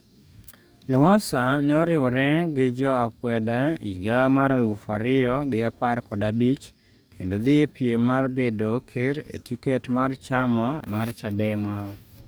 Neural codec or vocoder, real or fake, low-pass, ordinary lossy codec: codec, 44.1 kHz, 2.6 kbps, DAC; fake; none; none